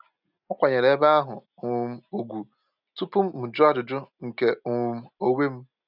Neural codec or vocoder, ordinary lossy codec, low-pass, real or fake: none; none; 5.4 kHz; real